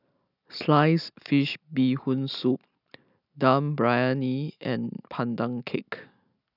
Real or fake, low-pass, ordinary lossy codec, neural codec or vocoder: real; 5.4 kHz; none; none